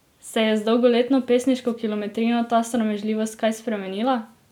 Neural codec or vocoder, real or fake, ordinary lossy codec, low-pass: none; real; none; 19.8 kHz